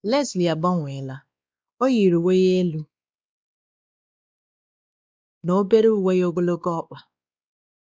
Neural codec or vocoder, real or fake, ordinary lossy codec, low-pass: codec, 16 kHz, 2 kbps, X-Codec, WavLM features, trained on Multilingual LibriSpeech; fake; none; none